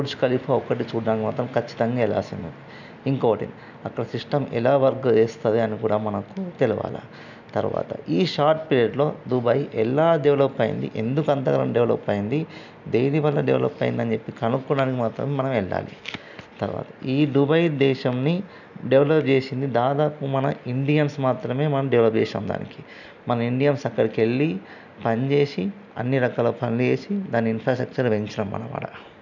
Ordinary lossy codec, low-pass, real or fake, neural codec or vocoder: none; 7.2 kHz; real; none